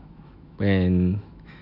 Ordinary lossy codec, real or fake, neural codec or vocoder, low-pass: none; fake; autoencoder, 48 kHz, 128 numbers a frame, DAC-VAE, trained on Japanese speech; 5.4 kHz